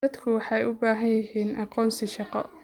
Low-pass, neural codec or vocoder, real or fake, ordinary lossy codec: 19.8 kHz; vocoder, 44.1 kHz, 128 mel bands every 256 samples, BigVGAN v2; fake; Opus, 32 kbps